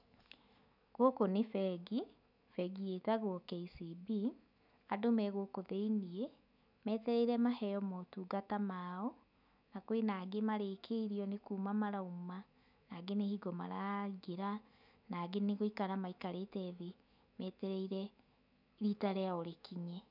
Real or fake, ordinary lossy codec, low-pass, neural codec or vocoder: fake; none; 5.4 kHz; autoencoder, 48 kHz, 128 numbers a frame, DAC-VAE, trained on Japanese speech